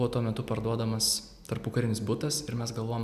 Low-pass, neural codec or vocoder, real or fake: 14.4 kHz; none; real